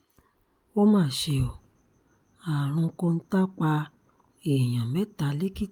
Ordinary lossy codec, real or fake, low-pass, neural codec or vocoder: Opus, 32 kbps; real; 19.8 kHz; none